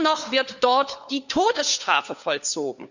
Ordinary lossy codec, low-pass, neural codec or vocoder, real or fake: none; 7.2 kHz; codec, 16 kHz, 4 kbps, FunCodec, trained on LibriTTS, 50 frames a second; fake